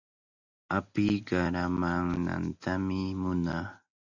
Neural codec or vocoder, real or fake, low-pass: none; real; 7.2 kHz